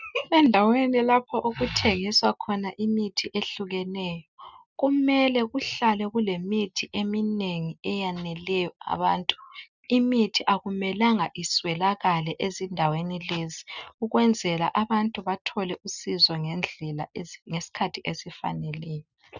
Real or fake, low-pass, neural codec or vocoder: real; 7.2 kHz; none